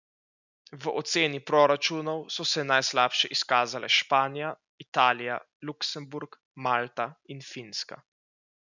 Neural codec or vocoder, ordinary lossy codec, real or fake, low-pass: none; none; real; 7.2 kHz